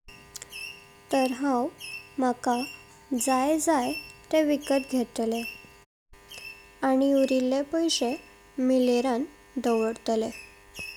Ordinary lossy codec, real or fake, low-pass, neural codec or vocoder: none; real; 19.8 kHz; none